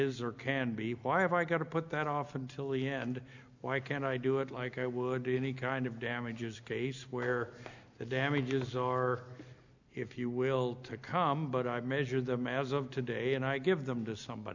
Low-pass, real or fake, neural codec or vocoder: 7.2 kHz; real; none